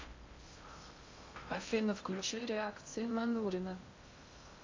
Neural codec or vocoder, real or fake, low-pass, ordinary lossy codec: codec, 16 kHz in and 24 kHz out, 0.6 kbps, FocalCodec, streaming, 2048 codes; fake; 7.2 kHz; MP3, 64 kbps